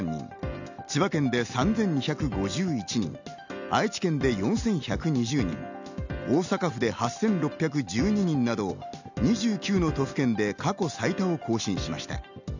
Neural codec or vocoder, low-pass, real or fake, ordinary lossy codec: none; 7.2 kHz; real; none